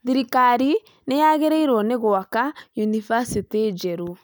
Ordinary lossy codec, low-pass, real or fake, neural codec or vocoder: none; none; real; none